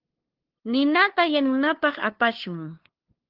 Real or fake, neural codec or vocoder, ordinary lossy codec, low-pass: fake; codec, 16 kHz, 2 kbps, FunCodec, trained on LibriTTS, 25 frames a second; Opus, 16 kbps; 5.4 kHz